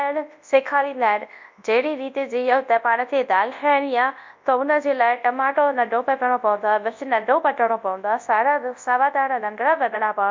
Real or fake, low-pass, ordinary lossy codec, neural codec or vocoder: fake; 7.2 kHz; MP3, 48 kbps; codec, 24 kHz, 0.9 kbps, WavTokenizer, large speech release